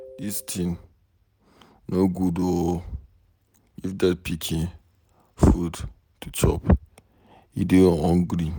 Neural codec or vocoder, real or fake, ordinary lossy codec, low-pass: none; real; none; none